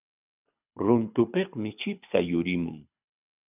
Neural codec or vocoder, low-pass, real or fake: codec, 24 kHz, 6 kbps, HILCodec; 3.6 kHz; fake